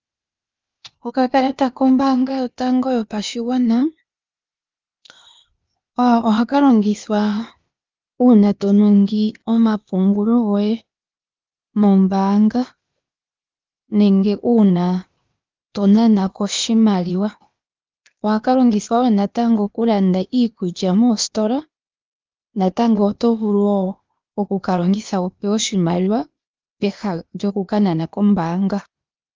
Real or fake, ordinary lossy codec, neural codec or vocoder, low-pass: fake; Opus, 32 kbps; codec, 16 kHz, 0.8 kbps, ZipCodec; 7.2 kHz